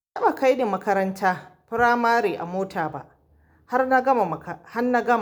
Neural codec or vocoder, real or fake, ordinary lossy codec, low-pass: none; real; none; none